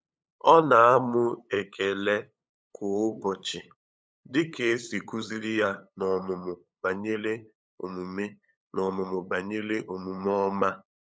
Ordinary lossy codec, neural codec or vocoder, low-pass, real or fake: none; codec, 16 kHz, 8 kbps, FunCodec, trained on LibriTTS, 25 frames a second; none; fake